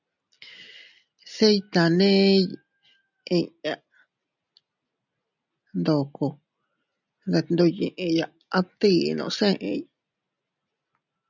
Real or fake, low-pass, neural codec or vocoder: real; 7.2 kHz; none